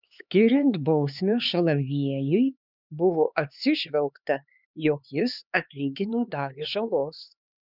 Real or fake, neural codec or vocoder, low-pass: fake; codec, 16 kHz, 4 kbps, X-Codec, HuBERT features, trained on LibriSpeech; 5.4 kHz